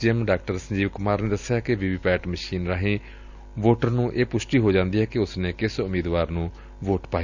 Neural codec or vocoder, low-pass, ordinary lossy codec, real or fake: none; 7.2 kHz; Opus, 64 kbps; real